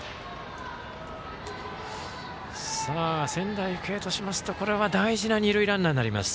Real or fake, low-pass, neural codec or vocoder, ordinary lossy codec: real; none; none; none